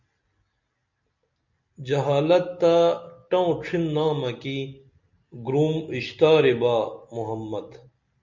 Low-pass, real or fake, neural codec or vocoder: 7.2 kHz; real; none